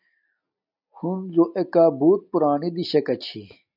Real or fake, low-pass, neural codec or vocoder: real; 5.4 kHz; none